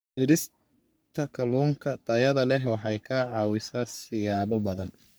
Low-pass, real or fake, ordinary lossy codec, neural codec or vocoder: none; fake; none; codec, 44.1 kHz, 3.4 kbps, Pupu-Codec